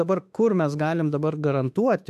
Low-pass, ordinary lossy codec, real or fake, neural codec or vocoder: 14.4 kHz; AAC, 96 kbps; fake; autoencoder, 48 kHz, 32 numbers a frame, DAC-VAE, trained on Japanese speech